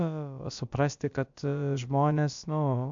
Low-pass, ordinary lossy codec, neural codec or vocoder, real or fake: 7.2 kHz; AAC, 64 kbps; codec, 16 kHz, about 1 kbps, DyCAST, with the encoder's durations; fake